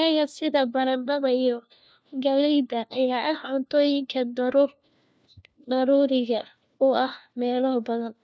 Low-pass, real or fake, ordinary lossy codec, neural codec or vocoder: none; fake; none; codec, 16 kHz, 1 kbps, FunCodec, trained on LibriTTS, 50 frames a second